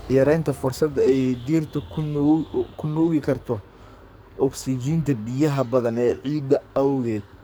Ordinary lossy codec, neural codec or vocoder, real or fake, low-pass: none; codec, 44.1 kHz, 2.6 kbps, SNAC; fake; none